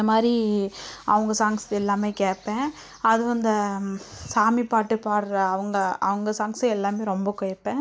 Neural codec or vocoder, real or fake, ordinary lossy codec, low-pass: none; real; none; none